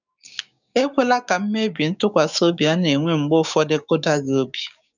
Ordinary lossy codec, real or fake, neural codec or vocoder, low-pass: none; fake; codec, 16 kHz, 6 kbps, DAC; 7.2 kHz